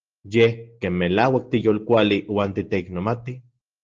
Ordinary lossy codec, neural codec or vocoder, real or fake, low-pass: Opus, 16 kbps; none; real; 7.2 kHz